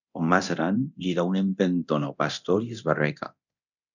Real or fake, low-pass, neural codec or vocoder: fake; 7.2 kHz; codec, 24 kHz, 0.9 kbps, DualCodec